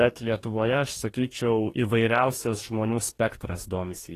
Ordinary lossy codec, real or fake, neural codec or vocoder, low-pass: AAC, 48 kbps; fake; codec, 44.1 kHz, 2.6 kbps, DAC; 14.4 kHz